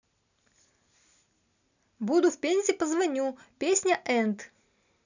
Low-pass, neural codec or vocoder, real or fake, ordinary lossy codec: 7.2 kHz; none; real; none